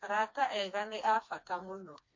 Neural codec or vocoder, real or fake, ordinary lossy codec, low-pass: codec, 16 kHz, 2 kbps, FreqCodec, smaller model; fake; MP3, 48 kbps; 7.2 kHz